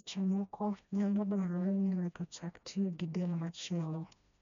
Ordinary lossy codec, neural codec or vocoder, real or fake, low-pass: none; codec, 16 kHz, 1 kbps, FreqCodec, smaller model; fake; 7.2 kHz